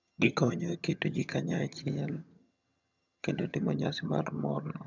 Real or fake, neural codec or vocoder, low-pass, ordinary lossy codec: fake; vocoder, 22.05 kHz, 80 mel bands, HiFi-GAN; 7.2 kHz; none